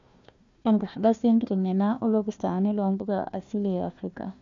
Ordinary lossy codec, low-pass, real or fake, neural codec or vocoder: MP3, 64 kbps; 7.2 kHz; fake; codec, 16 kHz, 1 kbps, FunCodec, trained on Chinese and English, 50 frames a second